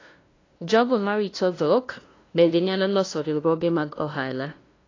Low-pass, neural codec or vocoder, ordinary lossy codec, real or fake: 7.2 kHz; codec, 16 kHz, 0.5 kbps, FunCodec, trained on LibriTTS, 25 frames a second; AAC, 32 kbps; fake